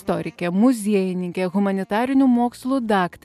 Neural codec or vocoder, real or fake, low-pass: none; real; 14.4 kHz